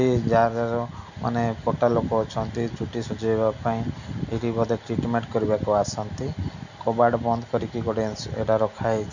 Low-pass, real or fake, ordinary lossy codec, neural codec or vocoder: 7.2 kHz; real; none; none